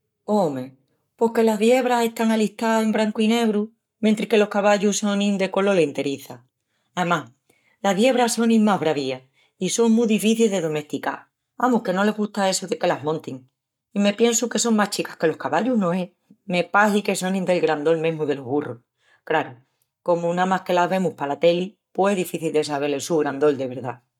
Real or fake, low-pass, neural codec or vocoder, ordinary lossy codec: fake; 19.8 kHz; codec, 44.1 kHz, 7.8 kbps, Pupu-Codec; none